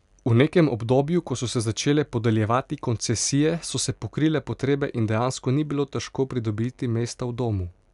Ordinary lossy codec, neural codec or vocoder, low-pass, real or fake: none; none; 10.8 kHz; real